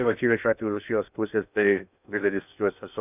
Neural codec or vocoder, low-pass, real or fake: codec, 16 kHz in and 24 kHz out, 0.6 kbps, FocalCodec, streaming, 2048 codes; 3.6 kHz; fake